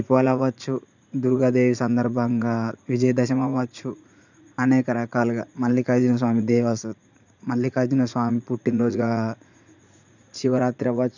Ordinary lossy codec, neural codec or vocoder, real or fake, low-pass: none; vocoder, 44.1 kHz, 80 mel bands, Vocos; fake; 7.2 kHz